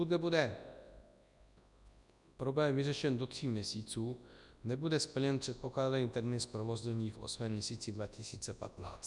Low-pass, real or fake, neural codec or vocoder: 10.8 kHz; fake; codec, 24 kHz, 0.9 kbps, WavTokenizer, large speech release